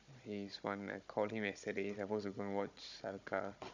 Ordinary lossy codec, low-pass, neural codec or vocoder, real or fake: none; 7.2 kHz; codec, 16 kHz, 16 kbps, FunCodec, trained on Chinese and English, 50 frames a second; fake